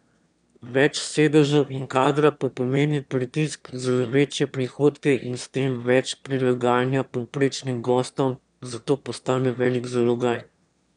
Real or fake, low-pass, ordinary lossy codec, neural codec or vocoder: fake; 9.9 kHz; none; autoencoder, 22.05 kHz, a latent of 192 numbers a frame, VITS, trained on one speaker